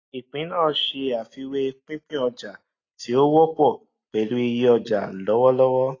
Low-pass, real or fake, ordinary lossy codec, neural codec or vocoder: 7.2 kHz; real; AAC, 32 kbps; none